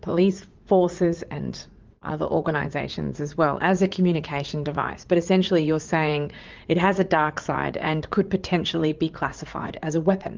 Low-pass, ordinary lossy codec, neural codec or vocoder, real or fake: 7.2 kHz; Opus, 32 kbps; vocoder, 44.1 kHz, 80 mel bands, Vocos; fake